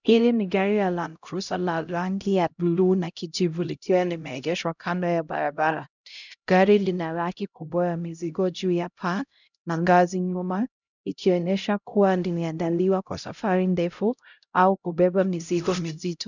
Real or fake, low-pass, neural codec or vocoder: fake; 7.2 kHz; codec, 16 kHz, 0.5 kbps, X-Codec, HuBERT features, trained on LibriSpeech